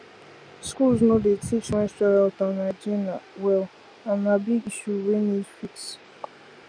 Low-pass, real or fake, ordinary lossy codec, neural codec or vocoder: 9.9 kHz; real; none; none